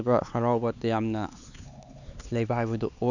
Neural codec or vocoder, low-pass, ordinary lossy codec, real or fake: codec, 16 kHz, 4 kbps, X-Codec, HuBERT features, trained on LibriSpeech; 7.2 kHz; none; fake